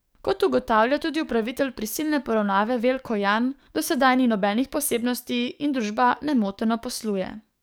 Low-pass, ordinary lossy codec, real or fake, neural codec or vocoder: none; none; fake; codec, 44.1 kHz, 7.8 kbps, DAC